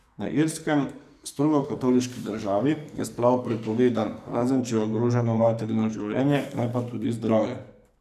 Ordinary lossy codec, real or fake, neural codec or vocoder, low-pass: none; fake; codec, 44.1 kHz, 2.6 kbps, SNAC; 14.4 kHz